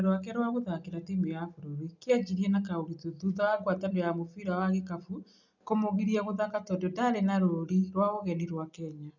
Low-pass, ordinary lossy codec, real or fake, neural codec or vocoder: 7.2 kHz; none; real; none